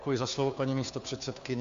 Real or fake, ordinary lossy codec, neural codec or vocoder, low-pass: fake; MP3, 64 kbps; codec, 16 kHz, 2 kbps, FunCodec, trained on Chinese and English, 25 frames a second; 7.2 kHz